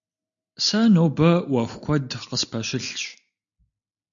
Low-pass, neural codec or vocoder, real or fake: 7.2 kHz; none; real